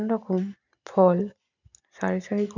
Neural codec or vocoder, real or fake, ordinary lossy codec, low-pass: none; real; none; 7.2 kHz